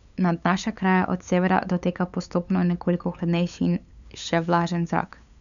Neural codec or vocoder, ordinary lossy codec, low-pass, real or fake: codec, 16 kHz, 8 kbps, FunCodec, trained on LibriTTS, 25 frames a second; none; 7.2 kHz; fake